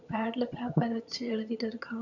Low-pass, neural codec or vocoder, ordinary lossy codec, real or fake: 7.2 kHz; vocoder, 22.05 kHz, 80 mel bands, HiFi-GAN; none; fake